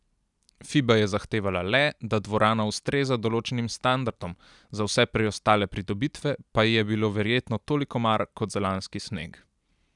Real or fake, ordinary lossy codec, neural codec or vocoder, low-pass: real; none; none; 10.8 kHz